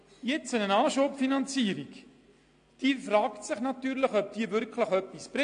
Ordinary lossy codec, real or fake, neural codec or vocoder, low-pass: AAC, 48 kbps; real; none; 9.9 kHz